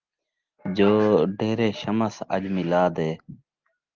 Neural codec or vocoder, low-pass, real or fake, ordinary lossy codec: none; 7.2 kHz; real; Opus, 32 kbps